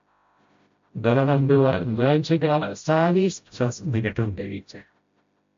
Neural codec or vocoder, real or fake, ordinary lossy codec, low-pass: codec, 16 kHz, 0.5 kbps, FreqCodec, smaller model; fake; MP3, 48 kbps; 7.2 kHz